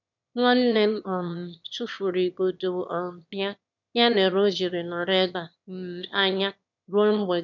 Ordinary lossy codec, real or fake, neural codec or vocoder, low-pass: none; fake; autoencoder, 22.05 kHz, a latent of 192 numbers a frame, VITS, trained on one speaker; 7.2 kHz